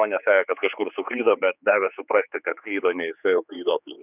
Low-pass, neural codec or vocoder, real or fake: 3.6 kHz; codec, 16 kHz, 4 kbps, X-Codec, HuBERT features, trained on general audio; fake